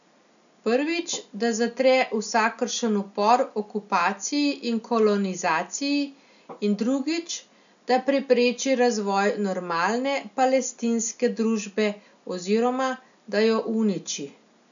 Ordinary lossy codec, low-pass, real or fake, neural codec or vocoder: none; 7.2 kHz; real; none